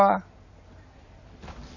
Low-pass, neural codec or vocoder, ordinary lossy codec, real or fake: 7.2 kHz; none; none; real